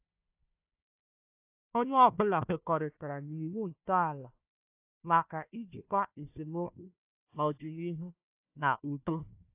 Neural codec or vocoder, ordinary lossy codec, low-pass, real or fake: codec, 16 kHz, 1 kbps, FunCodec, trained on Chinese and English, 50 frames a second; AAC, 32 kbps; 3.6 kHz; fake